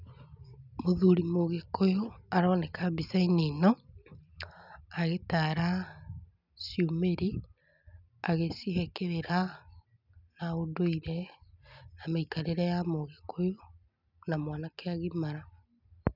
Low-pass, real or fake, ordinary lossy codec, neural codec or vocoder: 5.4 kHz; real; none; none